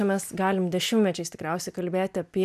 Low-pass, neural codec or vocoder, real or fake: 14.4 kHz; none; real